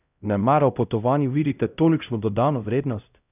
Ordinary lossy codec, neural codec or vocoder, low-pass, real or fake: none; codec, 16 kHz, 0.5 kbps, X-Codec, HuBERT features, trained on LibriSpeech; 3.6 kHz; fake